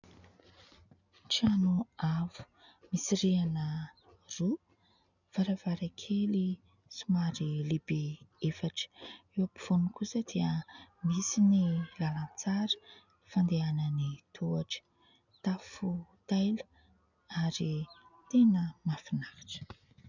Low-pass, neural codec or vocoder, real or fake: 7.2 kHz; none; real